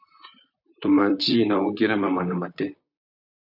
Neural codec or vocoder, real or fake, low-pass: vocoder, 44.1 kHz, 128 mel bands, Pupu-Vocoder; fake; 5.4 kHz